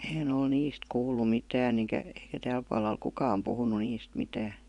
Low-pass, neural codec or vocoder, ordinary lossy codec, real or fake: 10.8 kHz; vocoder, 24 kHz, 100 mel bands, Vocos; none; fake